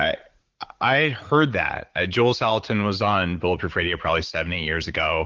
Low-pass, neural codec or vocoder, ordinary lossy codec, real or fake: 7.2 kHz; vocoder, 44.1 kHz, 80 mel bands, Vocos; Opus, 16 kbps; fake